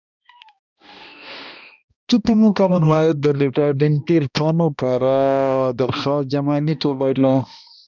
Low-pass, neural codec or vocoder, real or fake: 7.2 kHz; codec, 16 kHz, 1 kbps, X-Codec, HuBERT features, trained on balanced general audio; fake